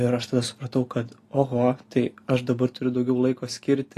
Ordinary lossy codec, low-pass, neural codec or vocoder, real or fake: AAC, 48 kbps; 14.4 kHz; none; real